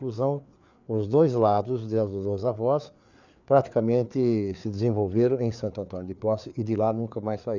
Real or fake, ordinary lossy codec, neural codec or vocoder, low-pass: fake; none; codec, 16 kHz, 4 kbps, FreqCodec, larger model; 7.2 kHz